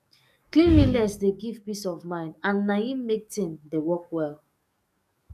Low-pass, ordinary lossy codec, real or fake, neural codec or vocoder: 14.4 kHz; none; fake; codec, 44.1 kHz, 7.8 kbps, DAC